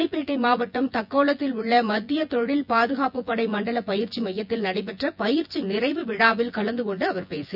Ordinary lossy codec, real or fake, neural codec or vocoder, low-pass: none; fake; vocoder, 24 kHz, 100 mel bands, Vocos; 5.4 kHz